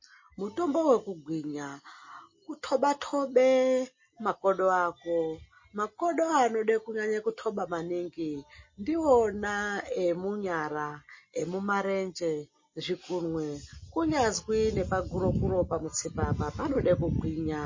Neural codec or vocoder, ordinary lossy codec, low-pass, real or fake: none; MP3, 32 kbps; 7.2 kHz; real